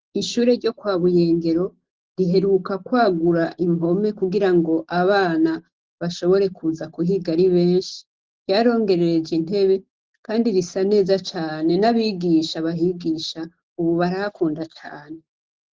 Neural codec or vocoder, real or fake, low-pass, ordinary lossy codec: none; real; 7.2 kHz; Opus, 16 kbps